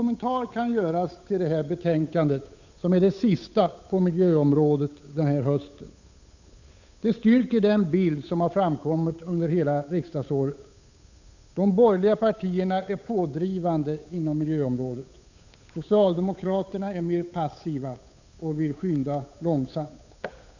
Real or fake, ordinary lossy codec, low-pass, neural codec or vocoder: fake; none; 7.2 kHz; codec, 16 kHz, 8 kbps, FunCodec, trained on Chinese and English, 25 frames a second